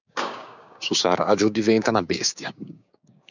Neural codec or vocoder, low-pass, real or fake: codec, 16 kHz, 6 kbps, DAC; 7.2 kHz; fake